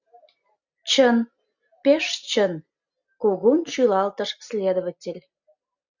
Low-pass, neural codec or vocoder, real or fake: 7.2 kHz; none; real